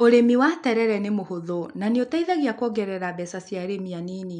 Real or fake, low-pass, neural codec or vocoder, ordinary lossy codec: real; 9.9 kHz; none; none